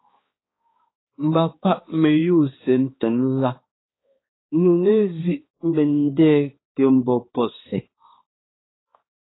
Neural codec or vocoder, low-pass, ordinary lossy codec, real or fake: codec, 16 kHz, 2 kbps, X-Codec, HuBERT features, trained on balanced general audio; 7.2 kHz; AAC, 16 kbps; fake